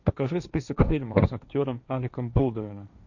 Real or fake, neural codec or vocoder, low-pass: fake; codec, 16 kHz, 1.1 kbps, Voila-Tokenizer; 7.2 kHz